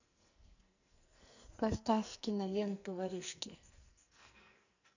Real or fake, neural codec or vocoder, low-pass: fake; codec, 44.1 kHz, 2.6 kbps, SNAC; 7.2 kHz